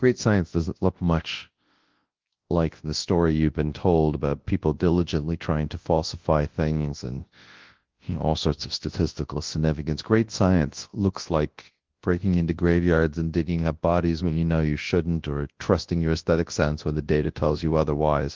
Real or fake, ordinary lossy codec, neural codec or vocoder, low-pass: fake; Opus, 16 kbps; codec, 24 kHz, 0.9 kbps, WavTokenizer, large speech release; 7.2 kHz